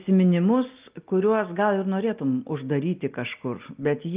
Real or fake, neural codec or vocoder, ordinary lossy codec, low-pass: real; none; Opus, 24 kbps; 3.6 kHz